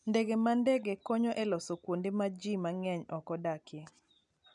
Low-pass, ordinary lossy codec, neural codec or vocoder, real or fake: 10.8 kHz; none; none; real